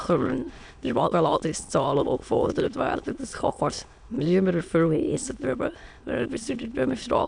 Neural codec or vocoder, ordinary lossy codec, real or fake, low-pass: autoencoder, 22.05 kHz, a latent of 192 numbers a frame, VITS, trained on many speakers; none; fake; 9.9 kHz